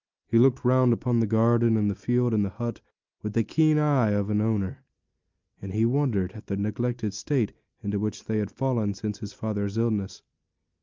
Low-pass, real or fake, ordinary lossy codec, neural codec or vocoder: 7.2 kHz; real; Opus, 24 kbps; none